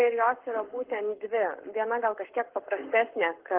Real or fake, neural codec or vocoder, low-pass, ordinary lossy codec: fake; codec, 44.1 kHz, 7.8 kbps, Pupu-Codec; 3.6 kHz; Opus, 16 kbps